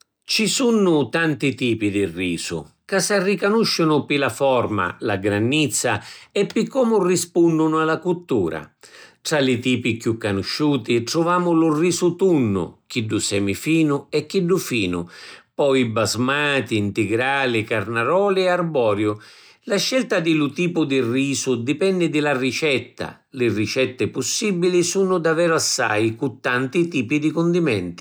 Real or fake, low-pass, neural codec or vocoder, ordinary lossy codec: real; none; none; none